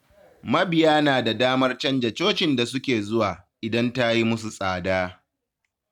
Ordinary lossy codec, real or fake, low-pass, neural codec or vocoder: none; real; 19.8 kHz; none